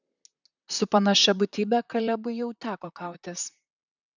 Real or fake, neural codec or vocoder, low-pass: fake; vocoder, 44.1 kHz, 128 mel bands, Pupu-Vocoder; 7.2 kHz